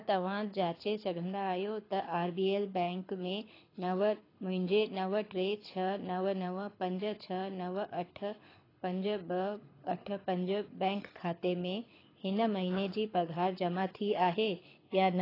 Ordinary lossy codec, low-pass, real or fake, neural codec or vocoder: AAC, 24 kbps; 5.4 kHz; fake; codec, 24 kHz, 6 kbps, HILCodec